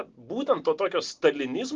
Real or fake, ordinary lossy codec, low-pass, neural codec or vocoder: real; Opus, 24 kbps; 7.2 kHz; none